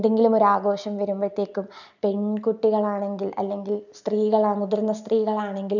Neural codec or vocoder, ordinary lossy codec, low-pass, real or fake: none; none; 7.2 kHz; real